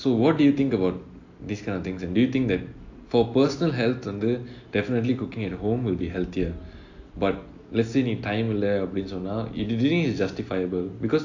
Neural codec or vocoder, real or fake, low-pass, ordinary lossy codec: none; real; 7.2 kHz; AAC, 48 kbps